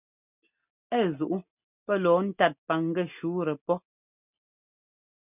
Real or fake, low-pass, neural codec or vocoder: real; 3.6 kHz; none